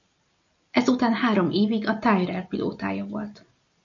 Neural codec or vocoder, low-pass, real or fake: none; 7.2 kHz; real